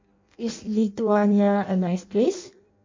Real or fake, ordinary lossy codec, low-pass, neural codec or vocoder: fake; MP3, 48 kbps; 7.2 kHz; codec, 16 kHz in and 24 kHz out, 0.6 kbps, FireRedTTS-2 codec